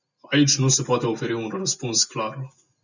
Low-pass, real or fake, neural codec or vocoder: 7.2 kHz; real; none